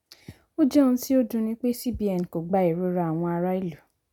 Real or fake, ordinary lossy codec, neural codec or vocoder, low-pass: real; none; none; 19.8 kHz